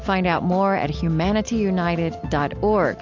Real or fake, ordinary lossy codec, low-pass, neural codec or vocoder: real; AAC, 48 kbps; 7.2 kHz; none